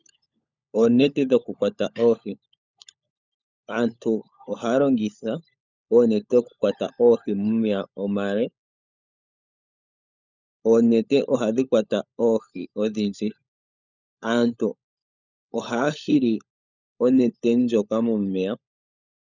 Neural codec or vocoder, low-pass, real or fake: codec, 16 kHz, 16 kbps, FunCodec, trained on LibriTTS, 50 frames a second; 7.2 kHz; fake